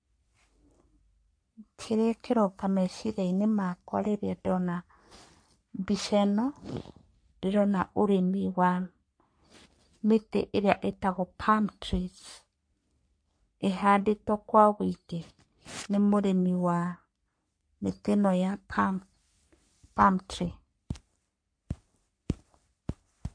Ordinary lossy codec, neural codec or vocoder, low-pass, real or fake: MP3, 48 kbps; codec, 44.1 kHz, 3.4 kbps, Pupu-Codec; 9.9 kHz; fake